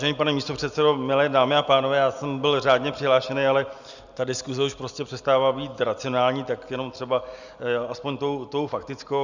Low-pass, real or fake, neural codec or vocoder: 7.2 kHz; real; none